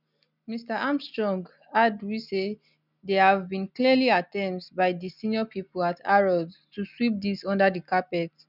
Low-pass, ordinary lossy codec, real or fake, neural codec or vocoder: 5.4 kHz; none; real; none